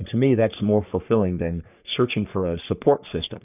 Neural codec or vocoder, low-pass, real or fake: codec, 44.1 kHz, 3.4 kbps, Pupu-Codec; 3.6 kHz; fake